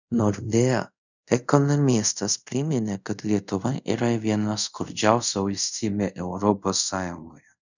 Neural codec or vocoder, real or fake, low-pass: codec, 24 kHz, 0.5 kbps, DualCodec; fake; 7.2 kHz